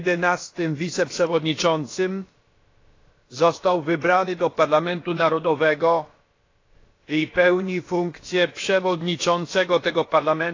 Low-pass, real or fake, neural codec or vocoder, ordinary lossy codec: 7.2 kHz; fake; codec, 16 kHz, about 1 kbps, DyCAST, with the encoder's durations; AAC, 32 kbps